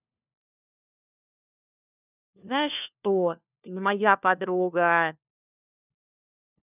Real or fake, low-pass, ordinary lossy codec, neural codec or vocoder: fake; 3.6 kHz; none; codec, 16 kHz, 1 kbps, FunCodec, trained on LibriTTS, 50 frames a second